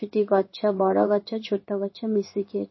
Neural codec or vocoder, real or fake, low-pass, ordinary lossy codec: codec, 16 kHz in and 24 kHz out, 1 kbps, XY-Tokenizer; fake; 7.2 kHz; MP3, 24 kbps